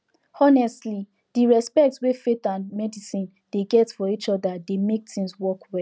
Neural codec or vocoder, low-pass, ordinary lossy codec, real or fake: none; none; none; real